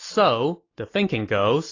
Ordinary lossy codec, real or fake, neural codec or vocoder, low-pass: AAC, 32 kbps; real; none; 7.2 kHz